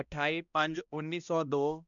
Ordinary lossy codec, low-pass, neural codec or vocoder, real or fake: none; 7.2 kHz; codec, 16 kHz, 1 kbps, X-Codec, HuBERT features, trained on balanced general audio; fake